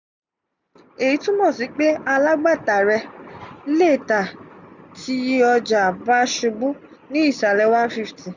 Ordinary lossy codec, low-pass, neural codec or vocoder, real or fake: none; 7.2 kHz; none; real